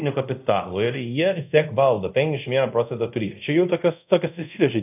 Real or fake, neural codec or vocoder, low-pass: fake; codec, 24 kHz, 0.5 kbps, DualCodec; 3.6 kHz